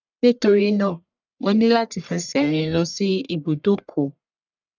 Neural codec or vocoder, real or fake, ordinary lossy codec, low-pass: codec, 44.1 kHz, 1.7 kbps, Pupu-Codec; fake; none; 7.2 kHz